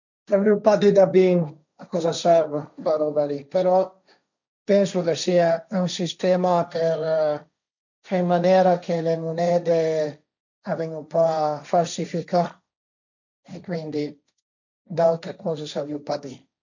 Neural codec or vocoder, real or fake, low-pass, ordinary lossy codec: codec, 16 kHz, 1.1 kbps, Voila-Tokenizer; fake; 7.2 kHz; none